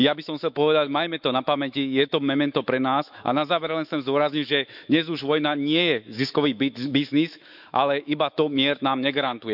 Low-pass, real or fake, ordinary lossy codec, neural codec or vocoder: 5.4 kHz; fake; none; autoencoder, 48 kHz, 128 numbers a frame, DAC-VAE, trained on Japanese speech